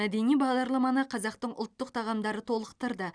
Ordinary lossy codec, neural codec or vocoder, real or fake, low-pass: Opus, 64 kbps; none; real; 9.9 kHz